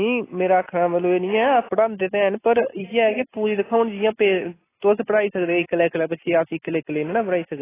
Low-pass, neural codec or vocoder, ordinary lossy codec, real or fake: 3.6 kHz; none; AAC, 16 kbps; real